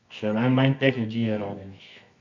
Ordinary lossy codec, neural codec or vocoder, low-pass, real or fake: none; codec, 24 kHz, 0.9 kbps, WavTokenizer, medium music audio release; 7.2 kHz; fake